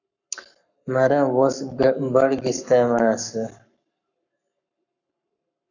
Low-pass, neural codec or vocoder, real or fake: 7.2 kHz; codec, 44.1 kHz, 7.8 kbps, Pupu-Codec; fake